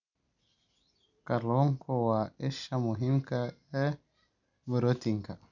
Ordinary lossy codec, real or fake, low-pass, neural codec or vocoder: none; real; 7.2 kHz; none